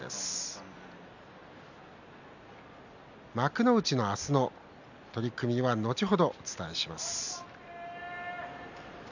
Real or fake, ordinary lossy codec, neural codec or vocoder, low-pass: real; none; none; 7.2 kHz